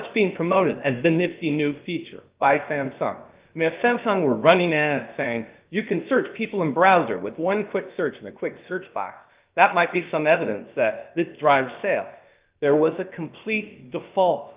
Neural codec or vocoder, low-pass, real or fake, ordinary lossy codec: codec, 16 kHz, about 1 kbps, DyCAST, with the encoder's durations; 3.6 kHz; fake; Opus, 32 kbps